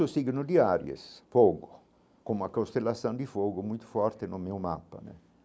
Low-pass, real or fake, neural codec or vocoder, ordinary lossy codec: none; real; none; none